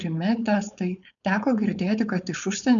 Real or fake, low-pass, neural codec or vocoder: fake; 7.2 kHz; codec, 16 kHz, 4.8 kbps, FACodec